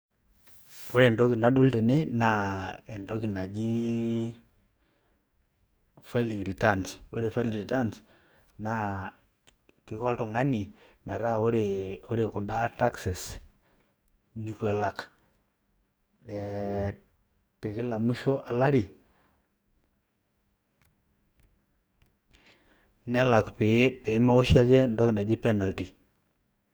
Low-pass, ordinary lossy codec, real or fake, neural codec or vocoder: none; none; fake; codec, 44.1 kHz, 2.6 kbps, DAC